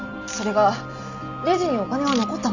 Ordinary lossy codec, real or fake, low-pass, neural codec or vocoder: Opus, 64 kbps; real; 7.2 kHz; none